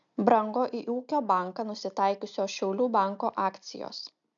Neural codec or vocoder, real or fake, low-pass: none; real; 7.2 kHz